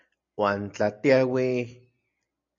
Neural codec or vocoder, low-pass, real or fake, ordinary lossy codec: none; 7.2 kHz; real; MP3, 64 kbps